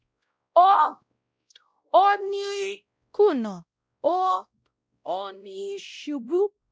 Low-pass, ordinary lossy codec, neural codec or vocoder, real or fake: none; none; codec, 16 kHz, 0.5 kbps, X-Codec, WavLM features, trained on Multilingual LibriSpeech; fake